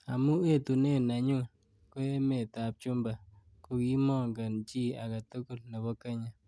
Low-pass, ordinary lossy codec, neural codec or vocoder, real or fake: none; none; none; real